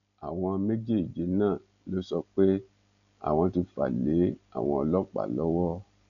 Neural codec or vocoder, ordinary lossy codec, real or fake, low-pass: none; none; real; 7.2 kHz